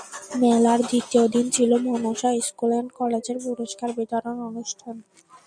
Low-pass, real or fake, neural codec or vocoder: 9.9 kHz; real; none